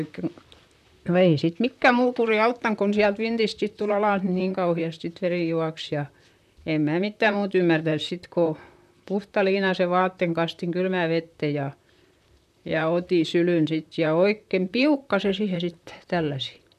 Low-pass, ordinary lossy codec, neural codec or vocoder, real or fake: 14.4 kHz; none; vocoder, 44.1 kHz, 128 mel bands, Pupu-Vocoder; fake